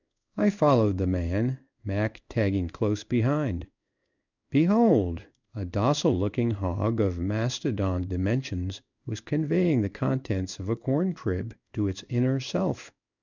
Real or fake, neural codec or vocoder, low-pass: fake; codec, 16 kHz in and 24 kHz out, 1 kbps, XY-Tokenizer; 7.2 kHz